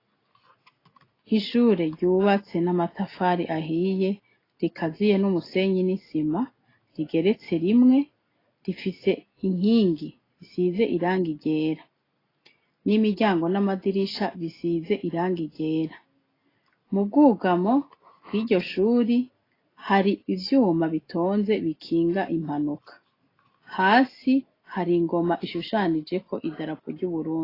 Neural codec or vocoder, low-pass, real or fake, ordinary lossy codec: none; 5.4 kHz; real; AAC, 24 kbps